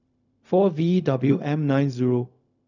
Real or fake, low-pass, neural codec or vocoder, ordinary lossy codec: fake; 7.2 kHz; codec, 16 kHz, 0.4 kbps, LongCat-Audio-Codec; none